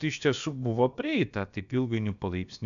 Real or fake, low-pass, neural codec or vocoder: fake; 7.2 kHz; codec, 16 kHz, about 1 kbps, DyCAST, with the encoder's durations